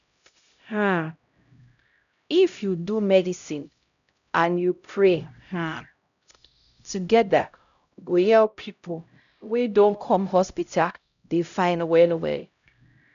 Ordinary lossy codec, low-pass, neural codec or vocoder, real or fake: none; 7.2 kHz; codec, 16 kHz, 0.5 kbps, X-Codec, HuBERT features, trained on LibriSpeech; fake